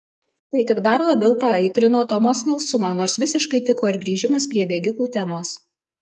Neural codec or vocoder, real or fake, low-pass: codec, 44.1 kHz, 2.6 kbps, SNAC; fake; 10.8 kHz